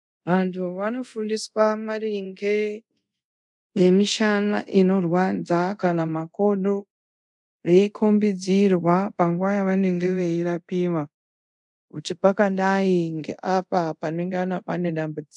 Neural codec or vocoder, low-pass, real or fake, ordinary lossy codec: codec, 24 kHz, 0.5 kbps, DualCodec; 10.8 kHz; fake; MP3, 96 kbps